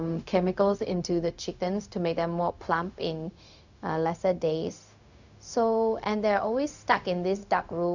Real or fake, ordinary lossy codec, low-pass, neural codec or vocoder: fake; none; 7.2 kHz; codec, 16 kHz, 0.4 kbps, LongCat-Audio-Codec